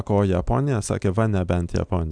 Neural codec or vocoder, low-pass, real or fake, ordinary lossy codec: none; 9.9 kHz; real; Opus, 64 kbps